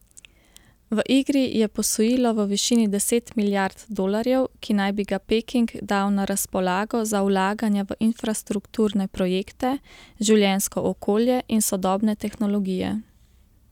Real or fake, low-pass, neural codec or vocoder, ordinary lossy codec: real; 19.8 kHz; none; none